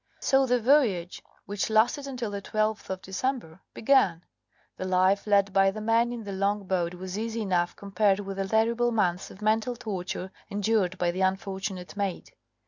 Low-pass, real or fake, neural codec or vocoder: 7.2 kHz; real; none